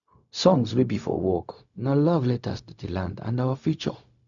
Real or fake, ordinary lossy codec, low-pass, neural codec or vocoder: fake; none; 7.2 kHz; codec, 16 kHz, 0.4 kbps, LongCat-Audio-Codec